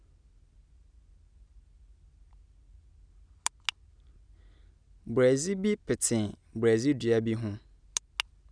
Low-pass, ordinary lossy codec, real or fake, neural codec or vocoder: 9.9 kHz; none; real; none